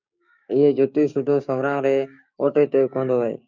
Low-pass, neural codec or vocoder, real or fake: 7.2 kHz; codec, 16 kHz, 6 kbps, DAC; fake